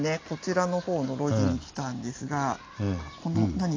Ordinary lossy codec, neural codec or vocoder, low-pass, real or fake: AAC, 32 kbps; none; 7.2 kHz; real